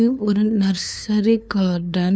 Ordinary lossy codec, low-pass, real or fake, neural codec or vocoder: none; none; fake; codec, 16 kHz, 2 kbps, FunCodec, trained on LibriTTS, 25 frames a second